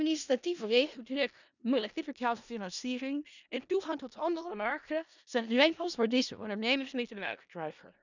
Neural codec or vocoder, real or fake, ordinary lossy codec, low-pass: codec, 16 kHz in and 24 kHz out, 0.4 kbps, LongCat-Audio-Codec, four codebook decoder; fake; none; 7.2 kHz